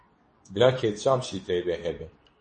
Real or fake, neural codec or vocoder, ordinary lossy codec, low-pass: fake; codec, 24 kHz, 0.9 kbps, WavTokenizer, medium speech release version 2; MP3, 32 kbps; 10.8 kHz